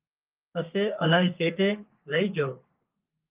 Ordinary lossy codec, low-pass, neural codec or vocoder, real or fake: Opus, 24 kbps; 3.6 kHz; codec, 32 kHz, 1.9 kbps, SNAC; fake